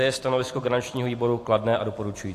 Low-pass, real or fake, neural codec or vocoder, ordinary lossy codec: 14.4 kHz; real; none; AAC, 64 kbps